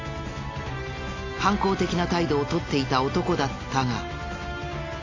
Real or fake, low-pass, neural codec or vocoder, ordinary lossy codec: real; 7.2 kHz; none; AAC, 32 kbps